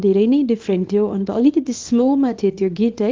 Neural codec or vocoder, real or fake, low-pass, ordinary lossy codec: codec, 24 kHz, 0.9 kbps, WavTokenizer, small release; fake; 7.2 kHz; Opus, 32 kbps